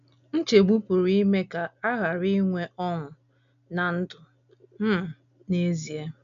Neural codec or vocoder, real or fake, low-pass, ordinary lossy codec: none; real; 7.2 kHz; AAC, 96 kbps